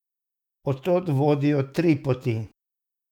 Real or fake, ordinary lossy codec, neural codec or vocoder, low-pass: fake; none; autoencoder, 48 kHz, 128 numbers a frame, DAC-VAE, trained on Japanese speech; 19.8 kHz